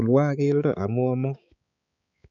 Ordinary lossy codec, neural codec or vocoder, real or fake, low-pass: none; codec, 16 kHz, 4 kbps, X-Codec, HuBERT features, trained on balanced general audio; fake; 7.2 kHz